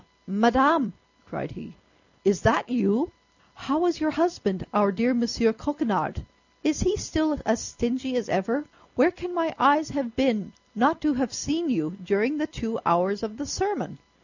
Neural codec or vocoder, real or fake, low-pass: none; real; 7.2 kHz